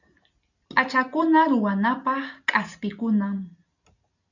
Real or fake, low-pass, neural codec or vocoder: real; 7.2 kHz; none